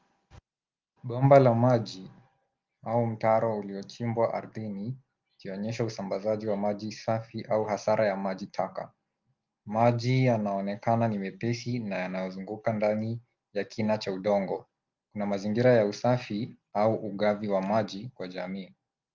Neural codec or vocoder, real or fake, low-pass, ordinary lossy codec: none; real; 7.2 kHz; Opus, 32 kbps